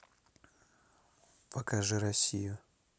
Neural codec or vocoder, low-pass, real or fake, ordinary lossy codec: none; none; real; none